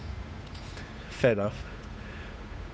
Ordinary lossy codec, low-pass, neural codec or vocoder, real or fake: none; none; codec, 16 kHz, 8 kbps, FunCodec, trained on Chinese and English, 25 frames a second; fake